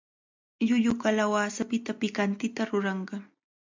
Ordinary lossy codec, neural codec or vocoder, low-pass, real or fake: MP3, 64 kbps; none; 7.2 kHz; real